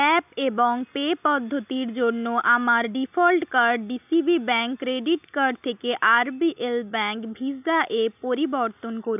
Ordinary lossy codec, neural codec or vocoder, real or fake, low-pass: none; none; real; 3.6 kHz